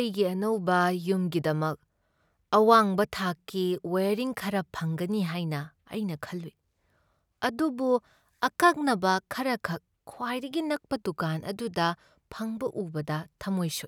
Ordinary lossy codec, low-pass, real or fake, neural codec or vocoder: none; none; real; none